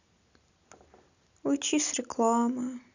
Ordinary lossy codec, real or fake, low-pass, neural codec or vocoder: none; real; 7.2 kHz; none